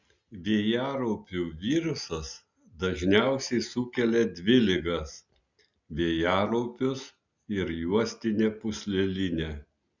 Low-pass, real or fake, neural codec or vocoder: 7.2 kHz; real; none